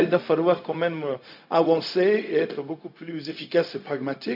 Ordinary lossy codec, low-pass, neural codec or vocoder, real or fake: none; 5.4 kHz; codec, 16 kHz, 0.4 kbps, LongCat-Audio-Codec; fake